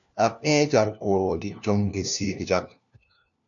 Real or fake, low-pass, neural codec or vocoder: fake; 7.2 kHz; codec, 16 kHz, 1 kbps, FunCodec, trained on LibriTTS, 50 frames a second